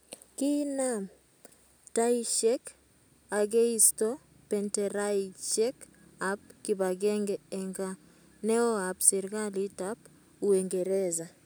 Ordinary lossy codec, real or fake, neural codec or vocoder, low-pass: none; real; none; none